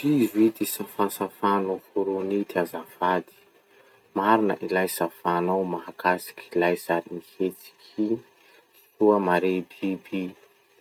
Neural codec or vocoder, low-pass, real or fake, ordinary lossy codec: none; none; real; none